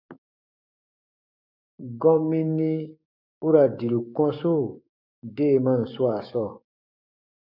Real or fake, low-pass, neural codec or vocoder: fake; 5.4 kHz; codec, 16 kHz, 6 kbps, DAC